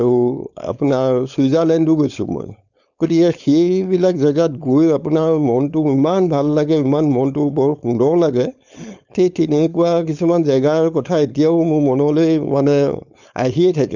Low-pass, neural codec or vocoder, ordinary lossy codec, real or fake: 7.2 kHz; codec, 16 kHz, 4.8 kbps, FACodec; none; fake